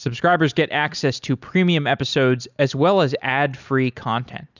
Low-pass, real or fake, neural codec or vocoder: 7.2 kHz; real; none